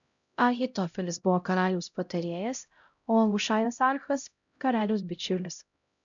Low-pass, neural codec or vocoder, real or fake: 7.2 kHz; codec, 16 kHz, 0.5 kbps, X-Codec, HuBERT features, trained on LibriSpeech; fake